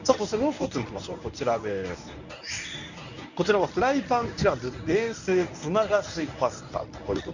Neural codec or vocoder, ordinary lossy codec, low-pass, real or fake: codec, 24 kHz, 0.9 kbps, WavTokenizer, medium speech release version 1; none; 7.2 kHz; fake